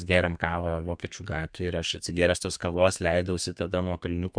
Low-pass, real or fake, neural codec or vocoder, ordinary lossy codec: 9.9 kHz; fake; codec, 32 kHz, 1.9 kbps, SNAC; MP3, 96 kbps